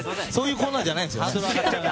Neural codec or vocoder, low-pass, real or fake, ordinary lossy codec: none; none; real; none